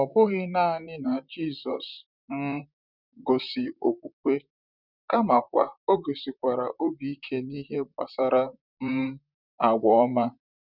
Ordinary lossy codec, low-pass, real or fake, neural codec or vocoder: none; 5.4 kHz; fake; vocoder, 44.1 kHz, 128 mel bands, Pupu-Vocoder